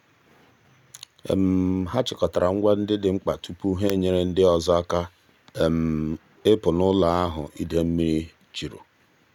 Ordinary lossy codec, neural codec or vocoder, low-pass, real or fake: none; none; 19.8 kHz; real